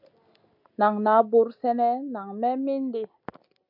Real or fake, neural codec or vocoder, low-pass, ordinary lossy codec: real; none; 5.4 kHz; MP3, 48 kbps